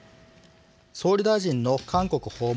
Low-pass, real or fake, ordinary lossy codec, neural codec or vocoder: none; real; none; none